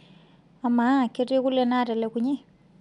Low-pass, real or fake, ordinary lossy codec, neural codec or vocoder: 10.8 kHz; real; none; none